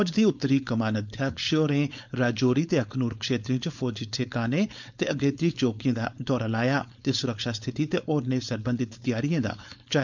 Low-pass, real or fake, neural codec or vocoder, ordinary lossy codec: 7.2 kHz; fake; codec, 16 kHz, 4.8 kbps, FACodec; none